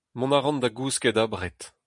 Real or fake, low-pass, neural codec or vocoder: real; 10.8 kHz; none